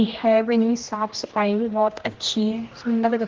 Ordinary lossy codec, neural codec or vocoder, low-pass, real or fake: Opus, 16 kbps; codec, 16 kHz, 1 kbps, X-Codec, HuBERT features, trained on general audio; 7.2 kHz; fake